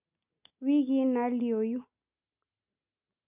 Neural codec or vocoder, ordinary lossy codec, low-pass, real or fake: none; none; 3.6 kHz; real